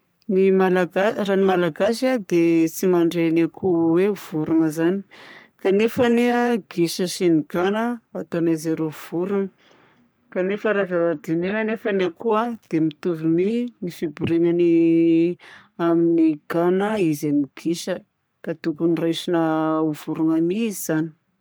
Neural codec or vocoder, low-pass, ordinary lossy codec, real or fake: codec, 44.1 kHz, 3.4 kbps, Pupu-Codec; none; none; fake